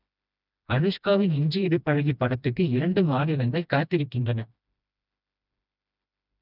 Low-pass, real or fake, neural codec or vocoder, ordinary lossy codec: 5.4 kHz; fake; codec, 16 kHz, 1 kbps, FreqCodec, smaller model; none